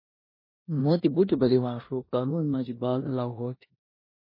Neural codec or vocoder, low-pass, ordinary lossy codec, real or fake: codec, 16 kHz in and 24 kHz out, 0.9 kbps, LongCat-Audio-Codec, four codebook decoder; 5.4 kHz; MP3, 24 kbps; fake